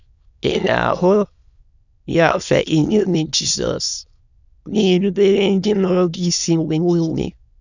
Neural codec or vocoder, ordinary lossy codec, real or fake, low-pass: autoencoder, 22.05 kHz, a latent of 192 numbers a frame, VITS, trained on many speakers; none; fake; 7.2 kHz